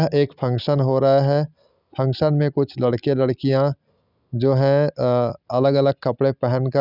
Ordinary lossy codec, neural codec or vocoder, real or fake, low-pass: none; none; real; 5.4 kHz